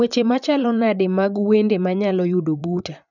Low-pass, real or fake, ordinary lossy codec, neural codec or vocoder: 7.2 kHz; fake; none; vocoder, 44.1 kHz, 128 mel bands, Pupu-Vocoder